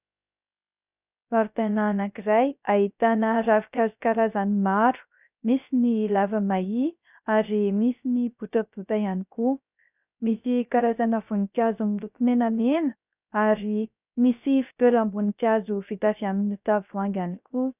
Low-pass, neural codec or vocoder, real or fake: 3.6 kHz; codec, 16 kHz, 0.3 kbps, FocalCodec; fake